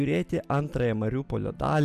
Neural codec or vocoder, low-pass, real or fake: none; 14.4 kHz; real